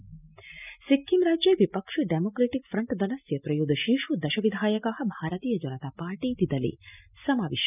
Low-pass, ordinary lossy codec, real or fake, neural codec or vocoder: 3.6 kHz; none; real; none